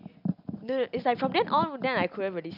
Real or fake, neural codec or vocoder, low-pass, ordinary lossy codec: real; none; 5.4 kHz; none